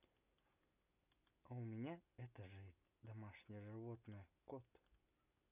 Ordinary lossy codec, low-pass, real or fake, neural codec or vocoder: none; 3.6 kHz; real; none